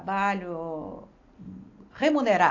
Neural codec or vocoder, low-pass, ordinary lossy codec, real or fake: none; 7.2 kHz; AAC, 48 kbps; real